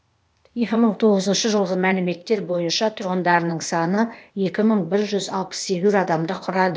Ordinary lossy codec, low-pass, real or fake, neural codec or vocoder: none; none; fake; codec, 16 kHz, 0.8 kbps, ZipCodec